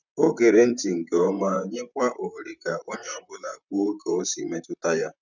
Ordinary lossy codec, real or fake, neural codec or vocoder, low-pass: none; fake; vocoder, 44.1 kHz, 128 mel bands, Pupu-Vocoder; 7.2 kHz